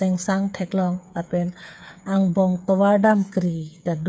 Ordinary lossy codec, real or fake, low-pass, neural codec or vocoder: none; fake; none; codec, 16 kHz, 8 kbps, FreqCodec, smaller model